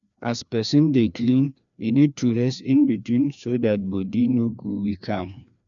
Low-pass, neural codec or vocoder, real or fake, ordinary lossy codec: 7.2 kHz; codec, 16 kHz, 2 kbps, FreqCodec, larger model; fake; none